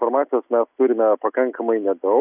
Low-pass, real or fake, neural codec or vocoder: 3.6 kHz; real; none